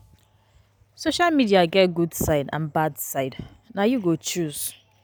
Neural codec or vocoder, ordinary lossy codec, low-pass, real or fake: none; none; none; real